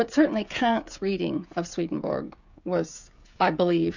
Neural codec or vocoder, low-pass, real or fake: codec, 16 kHz, 16 kbps, FreqCodec, smaller model; 7.2 kHz; fake